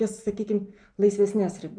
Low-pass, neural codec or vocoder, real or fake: 9.9 kHz; none; real